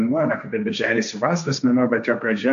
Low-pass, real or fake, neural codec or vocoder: 7.2 kHz; fake; codec, 16 kHz, 1.1 kbps, Voila-Tokenizer